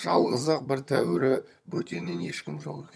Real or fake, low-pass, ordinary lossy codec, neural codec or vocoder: fake; none; none; vocoder, 22.05 kHz, 80 mel bands, HiFi-GAN